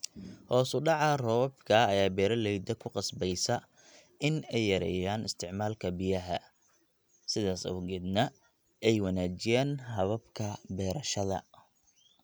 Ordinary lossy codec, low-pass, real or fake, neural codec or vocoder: none; none; real; none